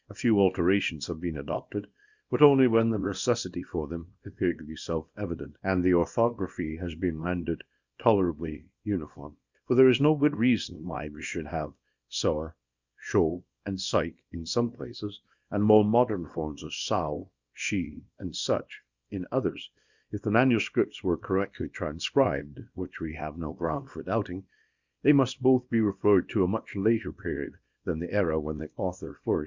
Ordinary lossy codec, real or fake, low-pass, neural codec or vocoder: Opus, 64 kbps; fake; 7.2 kHz; codec, 24 kHz, 0.9 kbps, WavTokenizer, small release